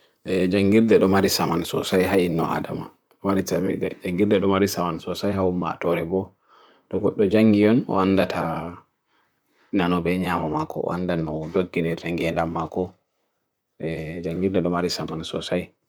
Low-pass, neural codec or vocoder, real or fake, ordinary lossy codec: none; vocoder, 44.1 kHz, 128 mel bands, Pupu-Vocoder; fake; none